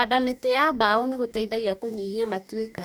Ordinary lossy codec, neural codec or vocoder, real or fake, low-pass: none; codec, 44.1 kHz, 2.6 kbps, DAC; fake; none